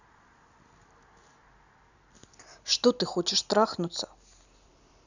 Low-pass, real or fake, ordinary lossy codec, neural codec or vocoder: 7.2 kHz; real; none; none